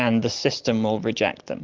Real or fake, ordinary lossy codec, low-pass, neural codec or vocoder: real; Opus, 24 kbps; 7.2 kHz; none